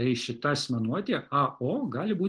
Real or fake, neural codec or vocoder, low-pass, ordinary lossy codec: real; none; 9.9 kHz; Opus, 24 kbps